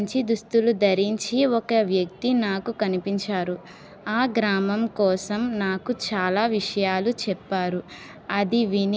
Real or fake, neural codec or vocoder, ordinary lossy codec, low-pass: real; none; none; none